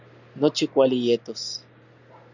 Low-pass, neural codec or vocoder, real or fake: 7.2 kHz; none; real